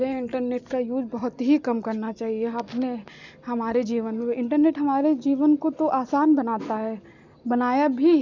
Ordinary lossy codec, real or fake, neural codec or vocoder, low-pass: none; real; none; 7.2 kHz